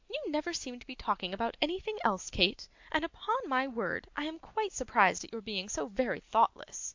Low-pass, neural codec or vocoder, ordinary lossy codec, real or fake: 7.2 kHz; none; MP3, 64 kbps; real